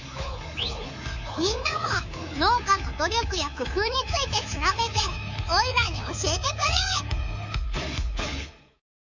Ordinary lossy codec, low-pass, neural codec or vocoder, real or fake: Opus, 64 kbps; 7.2 kHz; codec, 24 kHz, 3.1 kbps, DualCodec; fake